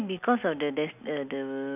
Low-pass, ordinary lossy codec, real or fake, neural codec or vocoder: 3.6 kHz; none; real; none